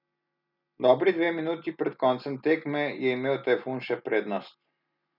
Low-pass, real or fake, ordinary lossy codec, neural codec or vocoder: 5.4 kHz; real; none; none